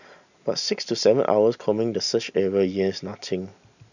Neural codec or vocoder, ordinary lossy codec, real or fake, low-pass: none; none; real; 7.2 kHz